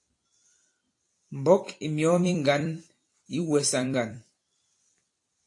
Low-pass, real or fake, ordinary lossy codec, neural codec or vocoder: 10.8 kHz; fake; AAC, 48 kbps; vocoder, 44.1 kHz, 128 mel bands every 256 samples, BigVGAN v2